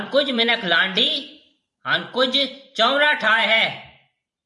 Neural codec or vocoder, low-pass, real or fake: vocoder, 44.1 kHz, 128 mel bands every 512 samples, BigVGAN v2; 10.8 kHz; fake